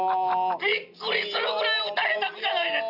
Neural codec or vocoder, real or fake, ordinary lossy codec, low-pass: none; real; none; 5.4 kHz